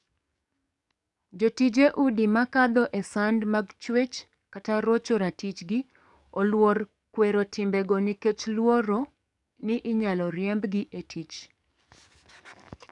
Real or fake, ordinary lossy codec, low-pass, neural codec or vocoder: fake; none; 10.8 kHz; codec, 44.1 kHz, 7.8 kbps, DAC